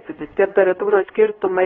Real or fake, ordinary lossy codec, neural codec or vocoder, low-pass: fake; AAC, 24 kbps; codec, 16 kHz, 2 kbps, X-Codec, HuBERT features, trained on LibriSpeech; 7.2 kHz